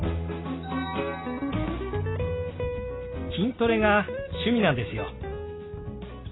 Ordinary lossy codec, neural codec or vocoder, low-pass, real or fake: AAC, 16 kbps; none; 7.2 kHz; real